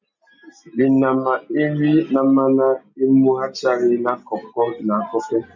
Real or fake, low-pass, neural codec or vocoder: real; 7.2 kHz; none